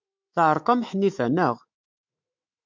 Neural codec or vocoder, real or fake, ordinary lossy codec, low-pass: autoencoder, 48 kHz, 128 numbers a frame, DAC-VAE, trained on Japanese speech; fake; MP3, 64 kbps; 7.2 kHz